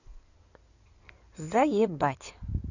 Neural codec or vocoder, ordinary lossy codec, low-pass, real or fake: codec, 16 kHz in and 24 kHz out, 2.2 kbps, FireRedTTS-2 codec; none; 7.2 kHz; fake